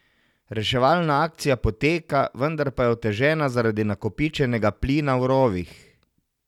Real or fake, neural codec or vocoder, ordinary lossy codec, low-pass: real; none; none; 19.8 kHz